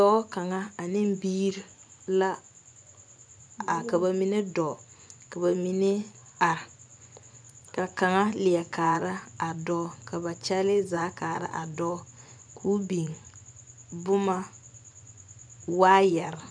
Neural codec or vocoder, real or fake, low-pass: vocoder, 24 kHz, 100 mel bands, Vocos; fake; 9.9 kHz